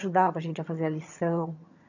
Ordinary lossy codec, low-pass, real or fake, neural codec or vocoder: none; 7.2 kHz; fake; vocoder, 22.05 kHz, 80 mel bands, HiFi-GAN